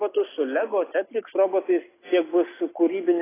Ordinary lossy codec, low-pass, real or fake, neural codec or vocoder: AAC, 16 kbps; 3.6 kHz; real; none